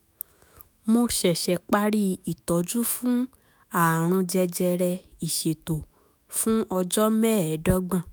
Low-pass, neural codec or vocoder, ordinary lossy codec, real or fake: none; autoencoder, 48 kHz, 128 numbers a frame, DAC-VAE, trained on Japanese speech; none; fake